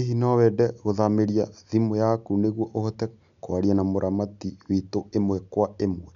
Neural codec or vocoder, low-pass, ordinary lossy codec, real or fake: none; 7.2 kHz; none; real